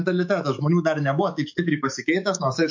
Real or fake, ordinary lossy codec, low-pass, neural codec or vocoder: fake; MP3, 48 kbps; 7.2 kHz; autoencoder, 48 kHz, 128 numbers a frame, DAC-VAE, trained on Japanese speech